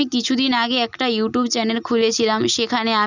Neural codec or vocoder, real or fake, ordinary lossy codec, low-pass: none; real; none; 7.2 kHz